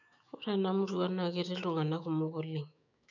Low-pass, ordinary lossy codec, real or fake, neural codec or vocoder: 7.2 kHz; none; fake; autoencoder, 48 kHz, 128 numbers a frame, DAC-VAE, trained on Japanese speech